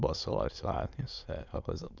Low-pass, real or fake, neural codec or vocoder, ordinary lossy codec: 7.2 kHz; fake; autoencoder, 22.05 kHz, a latent of 192 numbers a frame, VITS, trained on many speakers; none